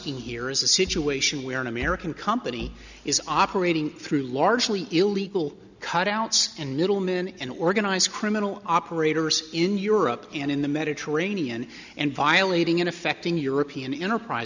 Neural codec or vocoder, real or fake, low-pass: none; real; 7.2 kHz